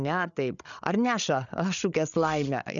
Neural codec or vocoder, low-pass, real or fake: codec, 16 kHz, 8 kbps, FreqCodec, larger model; 7.2 kHz; fake